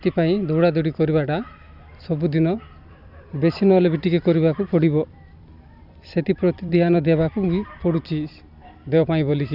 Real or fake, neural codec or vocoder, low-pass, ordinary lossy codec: fake; vocoder, 44.1 kHz, 128 mel bands every 256 samples, BigVGAN v2; 5.4 kHz; none